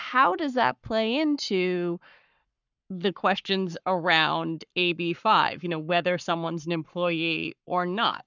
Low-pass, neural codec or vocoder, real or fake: 7.2 kHz; codec, 44.1 kHz, 7.8 kbps, Pupu-Codec; fake